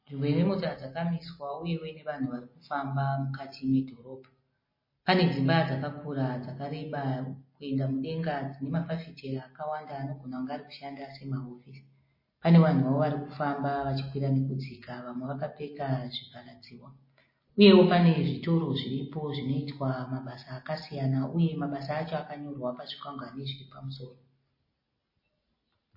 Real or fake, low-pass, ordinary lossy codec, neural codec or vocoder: real; 5.4 kHz; MP3, 24 kbps; none